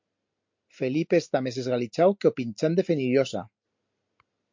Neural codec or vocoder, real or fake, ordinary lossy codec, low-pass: none; real; MP3, 48 kbps; 7.2 kHz